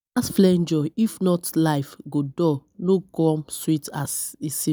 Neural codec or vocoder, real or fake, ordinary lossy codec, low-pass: none; real; none; none